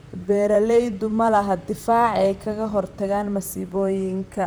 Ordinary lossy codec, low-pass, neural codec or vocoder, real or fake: none; none; vocoder, 44.1 kHz, 128 mel bands, Pupu-Vocoder; fake